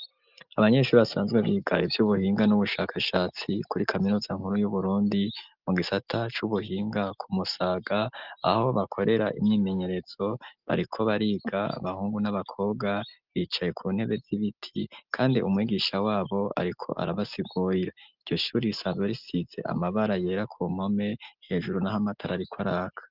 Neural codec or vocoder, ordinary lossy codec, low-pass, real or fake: none; Opus, 24 kbps; 5.4 kHz; real